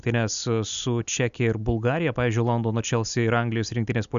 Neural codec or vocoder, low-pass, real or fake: none; 7.2 kHz; real